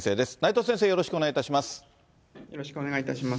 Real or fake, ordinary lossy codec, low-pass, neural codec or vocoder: real; none; none; none